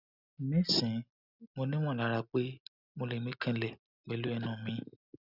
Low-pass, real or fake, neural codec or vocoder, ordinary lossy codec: 5.4 kHz; real; none; none